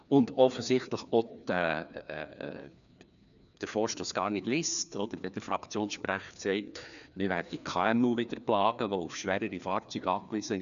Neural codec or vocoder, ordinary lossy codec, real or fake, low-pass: codec, 16 kHz, 2 kbps, FreqCodec, larger model; none; fake; 7.2 kHz